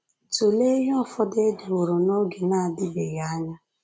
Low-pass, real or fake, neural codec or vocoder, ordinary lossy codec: none; real; none; none